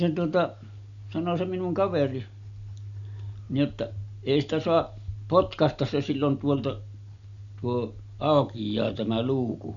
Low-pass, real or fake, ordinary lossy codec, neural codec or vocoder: 7.2 kHz; real; none; none